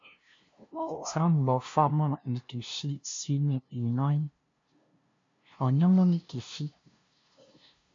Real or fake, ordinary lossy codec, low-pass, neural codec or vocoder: fake; MP3, 48 kbps; 7.2 kHz; codec, 16 kHz, 0.5 kbps, FunCodec, trained on LibriTTS, 25 frames a second